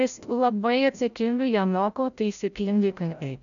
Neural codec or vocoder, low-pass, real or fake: codec, 16 kHz, 0.5 kbps, FreqCodec, larger model; 7.2 kHz; fake